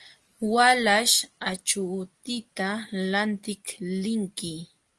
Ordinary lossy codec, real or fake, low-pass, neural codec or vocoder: Opus, 24 kbps; real; 10.8 kHz; none